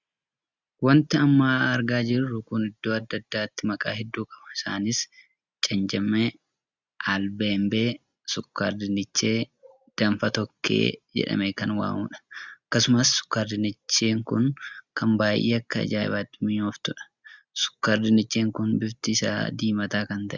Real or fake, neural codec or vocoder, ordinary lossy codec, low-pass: real; none; Opus, 64 kbps; 7.2 kHz